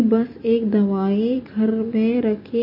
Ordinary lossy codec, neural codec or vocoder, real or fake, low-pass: MP3, 24 kbps; none; real; 5.4 kHz